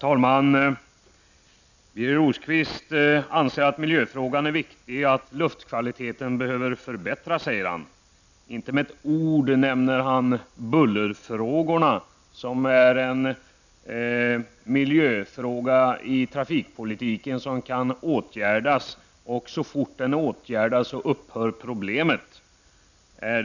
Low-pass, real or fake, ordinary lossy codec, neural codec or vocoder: 7.2 kHz; real; none; none